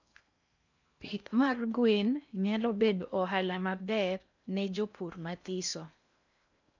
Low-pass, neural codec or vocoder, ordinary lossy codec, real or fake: 7.2 kHz; codec, 16 kHz in and 24 kHz out, 0.6 kbps, FocalCodec, streaming, 4096 codes; none; fake